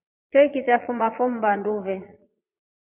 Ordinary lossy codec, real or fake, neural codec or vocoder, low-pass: AAC, 32 kbps; fake; vocoder, 22.05 kHz, 80 mel bands, Vocos; 3.6 kHz